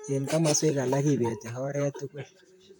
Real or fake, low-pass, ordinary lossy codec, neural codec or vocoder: fake; none; none; vocoder, 44.1 kHz, 128 mel bands, Pupu-Vocoder